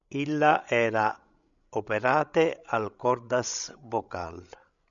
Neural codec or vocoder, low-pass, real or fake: codec, 16 kHz, 16 kbps, FreqCodec, larger model; 7.2 kHz; fake